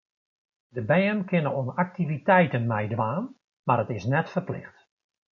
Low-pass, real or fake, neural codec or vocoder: 7.2 kHz; real; none